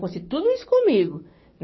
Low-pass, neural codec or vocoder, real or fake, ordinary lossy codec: 7.2 kHz; codec, 16 kHz, 6 kbps, DAC; fake; MP3, 24 kbps